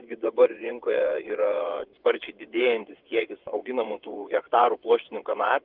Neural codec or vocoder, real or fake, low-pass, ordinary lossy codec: vocoder, 22.05 kHz, 80 mel bands, WaveNeXt; fake; 5.4 kHz; Opus, 32 kbps